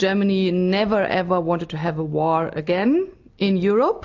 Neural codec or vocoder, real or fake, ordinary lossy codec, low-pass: none; real; AAC, 48 kbps; 7.2 kHz